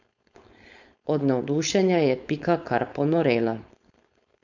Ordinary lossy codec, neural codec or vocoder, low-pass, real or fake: none; codec, 16 kHz, 4.8 kbps, FACodec; 7.2 kHz; fake